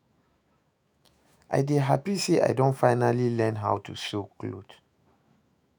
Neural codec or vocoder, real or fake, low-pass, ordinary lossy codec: autoencoder, 48 kHz, 128 numbers a frame, DAC-VAE, trained on Japanese speech; fake; none; none